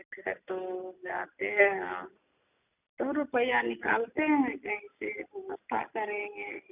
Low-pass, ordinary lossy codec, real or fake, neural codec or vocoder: 3.6 kHz; none; real; none